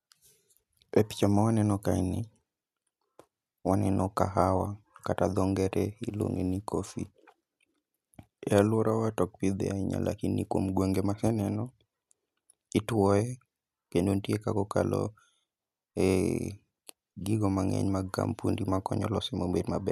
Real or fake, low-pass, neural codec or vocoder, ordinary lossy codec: real; 14.4 kHz; none; none